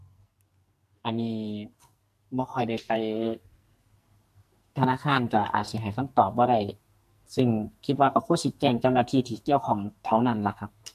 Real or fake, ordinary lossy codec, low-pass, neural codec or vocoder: fake; MP3, 64 kbps; 14.4 kHz; codec, 44.1 kHz, 2.6 kbps, SNAC